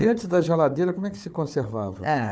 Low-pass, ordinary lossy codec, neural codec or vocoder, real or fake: none; none; codec, 16 kHz, 4 kbps, FunCodec, trained on Chinese and English, 50 frames a second; fake